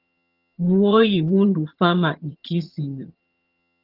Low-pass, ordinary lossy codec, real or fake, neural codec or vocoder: 5.4 kHz; Opus, 32 kbps; fake; vocoder, 22.05 kHz, 80 mel bands, HiFi-GAN